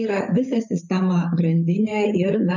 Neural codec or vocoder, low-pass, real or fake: codec, 16 kHz, 8 kbps, FreqCodec, larger model; 7.2 kHz; fake